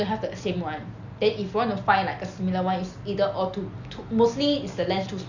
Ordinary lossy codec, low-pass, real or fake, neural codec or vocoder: none; 7.2 kHz; real; none